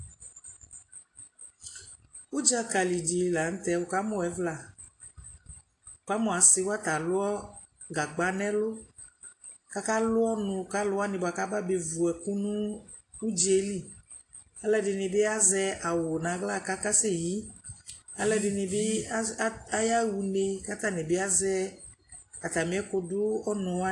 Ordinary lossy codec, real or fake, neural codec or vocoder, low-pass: AAC, 48 kbps; real; none; 10.8 kHz